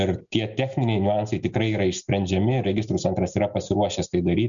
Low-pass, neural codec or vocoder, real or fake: 7.2 kHz; none; real